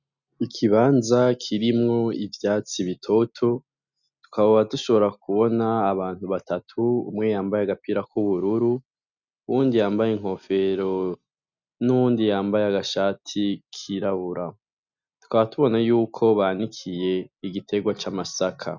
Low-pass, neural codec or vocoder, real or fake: 7.2 kHz; none; real